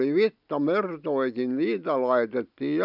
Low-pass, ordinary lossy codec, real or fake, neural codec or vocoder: 5.4 kHz; none; real; none